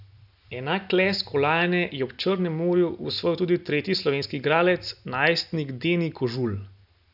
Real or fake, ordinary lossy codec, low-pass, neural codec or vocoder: real; none; 5.4 kHz; none